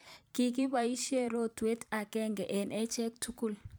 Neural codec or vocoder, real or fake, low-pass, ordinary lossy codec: vocoder, 44.1 kHz, 128 mel bands every 512 samples, BigVGAN v2; fake; none; none